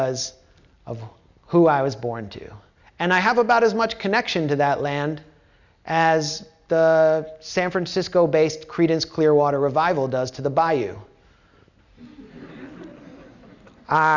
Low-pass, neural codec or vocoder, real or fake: 7.2 kHz; none; real